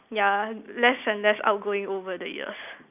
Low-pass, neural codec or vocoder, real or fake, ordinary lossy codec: 3.6 kHz; none; real; none